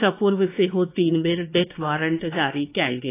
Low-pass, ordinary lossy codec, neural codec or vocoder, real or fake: 3.6 kHz; AAC, 24 kbps; codec, 16 kHz, 2 kbps, X-Codec, WavLM features, trained on Multilingual LibriSpeech; fake